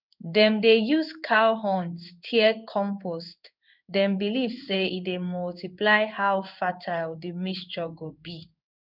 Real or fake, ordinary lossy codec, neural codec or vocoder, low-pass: fake; none; codec, 16 kHz in and 24 kHz out, 1 kbps, XY-Tokenizer; 5.4 kHz